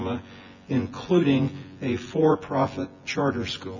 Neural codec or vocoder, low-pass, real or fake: vocoder, 24 kHz, 100 mel bands, Vocos; 7.2 kHz; fake